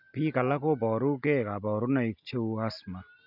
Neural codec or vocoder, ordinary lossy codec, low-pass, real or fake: none; none; 5.4 kHz; real